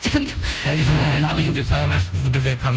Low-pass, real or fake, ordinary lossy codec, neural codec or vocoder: none; fake; none; codec, 16 kHz, 0.5 kbps, FunCodec, trained on Chinese and English, 25 frames a second